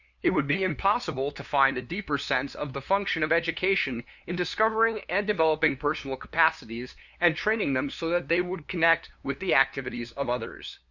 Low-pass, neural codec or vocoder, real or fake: 7.2 kHz; codec, 16 kHz, 2 kbps, FunCodec, trained on LibriTTS, 25 frames a second; fake